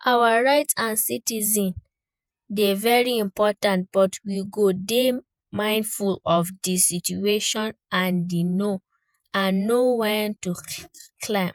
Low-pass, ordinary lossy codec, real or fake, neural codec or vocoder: none; none; fake; vocoder, 48 kHz, 128 mel bands, Vocos